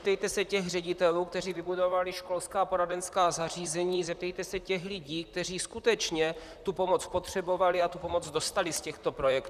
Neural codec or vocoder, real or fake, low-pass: vocoder, 44.1 kHz, 128 mel bands, Pupu-Vocoder; fake; 14.4 kHz